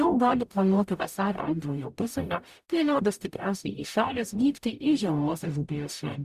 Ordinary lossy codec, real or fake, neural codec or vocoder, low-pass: Opus, 64 kbps; fake; codec, 44.1 kHz, 0.9 kbps, DAC; 14.4 kHz